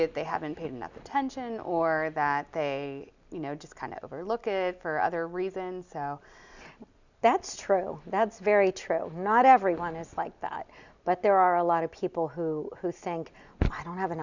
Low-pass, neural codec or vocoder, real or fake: 7.2 kHz; none; real